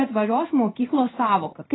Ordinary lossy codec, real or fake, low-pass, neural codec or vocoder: AAC, 16 kbps; fake; 7.2 kHz; codec, 16 kHz in and 24 kHz out, 1 kbps, XY-Tokenizer